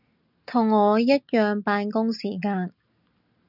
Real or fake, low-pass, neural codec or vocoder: real; 5.4 kHz; none